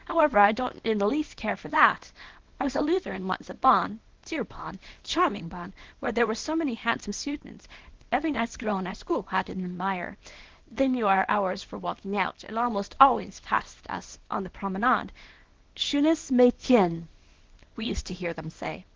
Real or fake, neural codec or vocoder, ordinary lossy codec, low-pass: fake; codec, 24 kHz, 0.9 kbps, WavTokenizer, small release; Opus, 16 kbps; 7.2 kHz